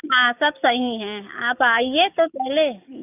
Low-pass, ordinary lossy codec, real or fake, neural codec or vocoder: 3.6 kHz; none; fake; codec, 44.1 kHz, 7.8 kbps, DAC